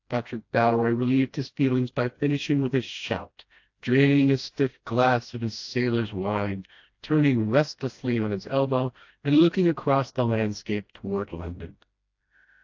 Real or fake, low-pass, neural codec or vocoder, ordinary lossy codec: fake; 7.2 kHz; codec, 16 kHz, 1 kbps, FreqCodec, smaller model; AAC, 48 kbps